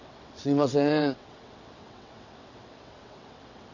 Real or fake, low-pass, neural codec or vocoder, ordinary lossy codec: fake; 7.2 kHz; vocoder, 22.05 kHz, 80 mel bands, WaveNeXt; none